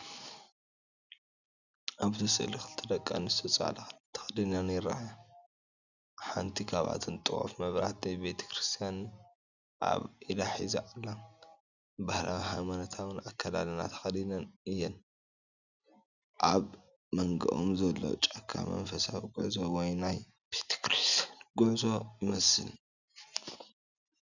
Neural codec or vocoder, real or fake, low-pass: none; real; 7.2 kHz